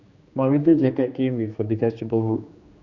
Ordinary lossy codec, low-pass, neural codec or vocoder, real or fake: Opus, 64 kbps; 7.2 kHz; codec, 16 kHz, 2 kbps, X-Codec, HuBERT features, trained on general audio; fake